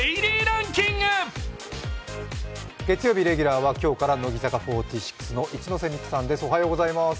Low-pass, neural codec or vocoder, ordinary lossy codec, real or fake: none; none; none; real